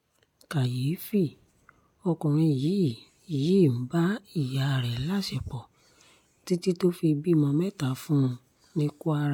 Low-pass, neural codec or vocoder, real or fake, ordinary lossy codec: 19.8 kHz; none; real; MP3, 96 kbps